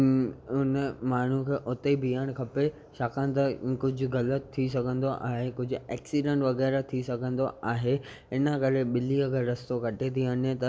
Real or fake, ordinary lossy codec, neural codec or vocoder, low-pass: real; none; none; none